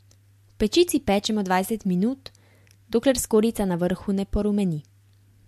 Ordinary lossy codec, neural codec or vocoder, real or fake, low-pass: MP3, 64 kbps; none; real; 14.4 kHz